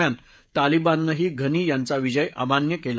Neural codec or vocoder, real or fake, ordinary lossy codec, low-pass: codec, 16 kHz, 16 kbps, FreqCodec, smaller model; fake; none; none